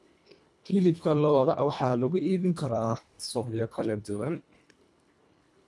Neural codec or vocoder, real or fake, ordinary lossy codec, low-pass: codec, 24 kHz, 1.5 kbps, HILCodec; fake; none; none